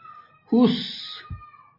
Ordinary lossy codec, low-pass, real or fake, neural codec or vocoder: MP3, 24 kbps; 5.4 kHz; real; none